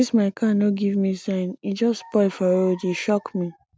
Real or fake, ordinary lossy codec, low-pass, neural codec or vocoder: real; none; none; none